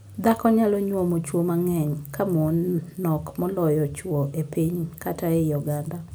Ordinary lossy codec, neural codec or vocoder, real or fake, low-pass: none; none; real; none